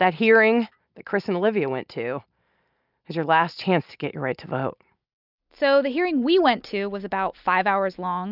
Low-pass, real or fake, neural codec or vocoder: 5.4 kHz; real; none